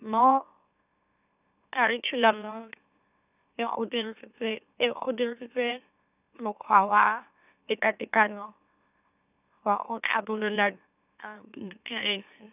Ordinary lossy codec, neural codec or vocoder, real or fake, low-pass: none; autoencoder, 44.1 kHz, a latent of 192 numbers a frame, MeloTTS; fake; 3.6 kHz